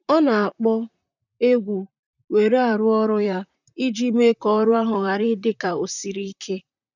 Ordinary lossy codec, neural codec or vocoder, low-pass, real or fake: none; vocoder, 44.1 kHz, 128 mel bands, Pupu-Vocoder; 7.2 kHz; fake